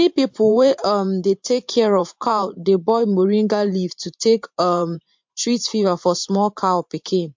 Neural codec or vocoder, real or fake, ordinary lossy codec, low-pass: vocoder, 44.1 kHz, 128 mel bands every 512 samples, BigVGAN v2; fake; MP3, 48 kbps; 7.2 kHz